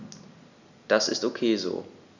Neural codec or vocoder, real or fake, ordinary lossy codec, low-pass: none; real; none; 7.2 kHz